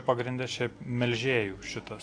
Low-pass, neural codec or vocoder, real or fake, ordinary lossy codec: 9.9 kHz; none; real; AAC, 48 kbps